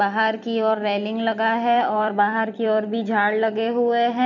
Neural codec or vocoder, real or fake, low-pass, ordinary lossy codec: vocoder, 44.1 kHz, 128 mel bands every 256 samples, BigVGAN v2; fake; 7.2 kHz; none